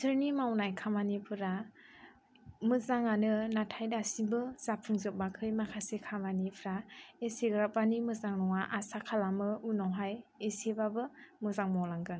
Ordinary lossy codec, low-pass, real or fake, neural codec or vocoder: none; none; real; none